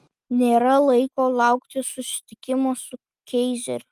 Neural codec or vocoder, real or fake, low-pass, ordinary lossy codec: none; real; 14.4 kHz; Opus, 32 kbps